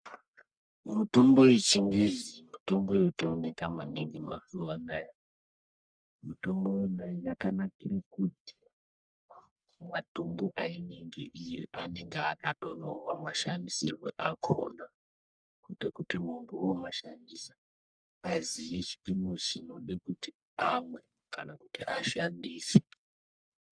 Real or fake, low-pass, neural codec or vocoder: fake; 9.9 kHz; codec, 44.1 kHz, 1.7 kbps, Pupu-Codec